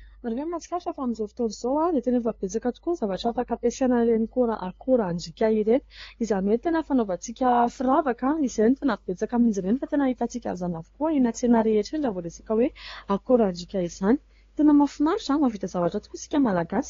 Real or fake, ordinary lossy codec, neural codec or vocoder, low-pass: fake; AAC, 32 kbps; codec, 16 kHz, 2 kbps, FunCodec, trained on LibriTTS, 25 frames a second; 7.2 kHz